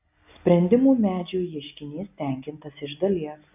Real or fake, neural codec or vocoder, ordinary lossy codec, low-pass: real; none; AAC, 32 kbps; 3.6 kHz